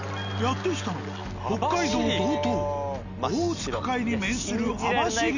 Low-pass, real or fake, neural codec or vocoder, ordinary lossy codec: 7.2 kHz; real; none; none